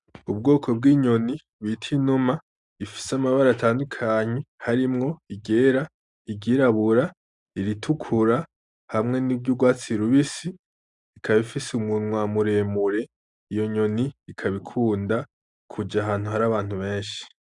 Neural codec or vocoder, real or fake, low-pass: none; real; 10.8 kHz